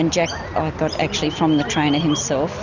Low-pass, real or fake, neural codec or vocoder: 7.2 kHz; real; none